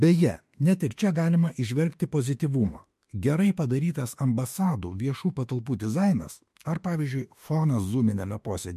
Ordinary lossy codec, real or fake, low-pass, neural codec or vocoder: MP3, 64 kbps; fake; 14.4 kHz; autoencoder, 48 kHz, 32 numbers a frame, DAC-VAE, trained on Japanese speech